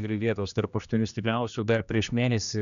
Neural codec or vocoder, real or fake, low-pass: codec, 16 kHz, 1 kbps, X-Codec, HuBERT features, trained on general audio; fake; 7.2 kHz